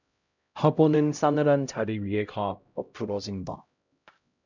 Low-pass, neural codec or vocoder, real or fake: 7.2 kHz; codec, 16 kHz, 0.5 kbps, X-Codec, HuBERT features, trained on LibriSpeech; fake